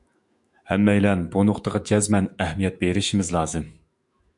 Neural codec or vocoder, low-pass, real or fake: autoencoder, 48 kHz, 128 numbers a frame, DAC-VAE, trained on Japanese speech; 10.8 kHz; fake